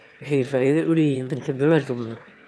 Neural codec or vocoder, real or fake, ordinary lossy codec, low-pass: autoencoder, 22.05 kHz, a latent of 192 numbers a frame, VITS, trained on one speaker; fake; none; none